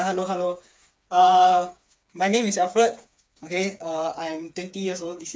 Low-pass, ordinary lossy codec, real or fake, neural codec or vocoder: none; none; fake; codec, 16 kHz, 4 kbps, FreqCodec, smaller model